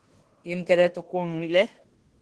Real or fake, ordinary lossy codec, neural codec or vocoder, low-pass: fake; Opus, 16 kbps; codec, 16 kHz in and 24 kHz out, 0.9 kbps, LongCat-Audio-Codec, fine tuned four codebook decoder; 10.8 kHz